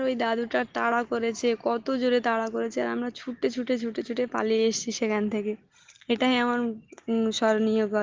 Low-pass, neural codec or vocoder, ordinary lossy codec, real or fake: 7.2 kHz; none; Opus, 16 kbps; real